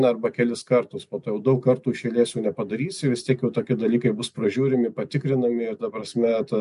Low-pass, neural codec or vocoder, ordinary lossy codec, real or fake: 10.8 kHz; none; MP3, 64 kbps; real